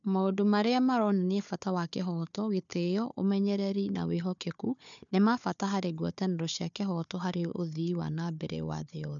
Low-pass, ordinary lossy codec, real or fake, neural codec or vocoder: 7.2 kHz; none; fake; codec, 16 kHz, 4 kbps, FunCodec, trained on Chinese and English, 50 frames a second